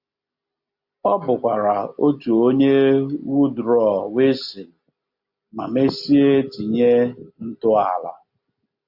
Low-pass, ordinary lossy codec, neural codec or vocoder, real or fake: 5.4 kHz; AAC, 48 kbps; none; real